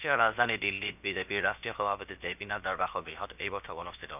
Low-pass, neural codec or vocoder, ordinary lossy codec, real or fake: 3.6 kHz; codec, 16 kHz, about 1 kbps, DyCAST, with the encoder's durations; none; fake